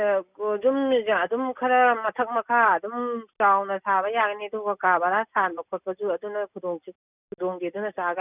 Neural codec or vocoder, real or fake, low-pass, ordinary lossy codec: none; real; 3.6 kHz; none